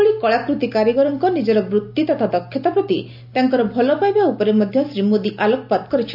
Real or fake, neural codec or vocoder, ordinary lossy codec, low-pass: real; none; AAC, 48 kbps; 5.4 kHz